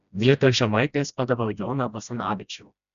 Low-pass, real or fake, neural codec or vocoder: 7.2 kHz; fake; codec, 16 kHz, 1 kbps, FreqCodec, smaller model